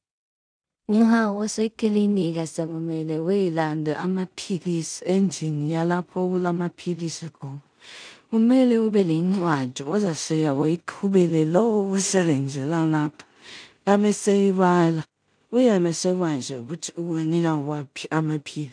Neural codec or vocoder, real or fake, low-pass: codec, 16 kHz in and 24 kHz out, 0.4 kbps, LongCat-Audio-Codec, two codebook decoder; fake; 9.9 kHz